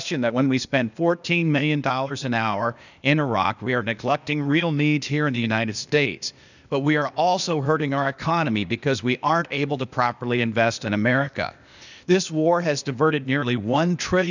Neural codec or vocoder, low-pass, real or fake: codec, 16 kHz, 0.8 kbps, ZipCodec; 7.2 kHz; fake